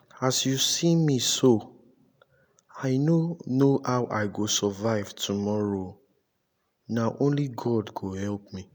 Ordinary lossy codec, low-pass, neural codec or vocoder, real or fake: none; none; none; real